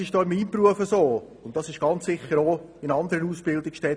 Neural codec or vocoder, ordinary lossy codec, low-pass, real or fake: none; none; none; real